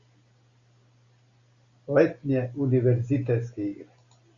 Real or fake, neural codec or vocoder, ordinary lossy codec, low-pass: real; none; Opus, 64 kbps; 7.2 kHz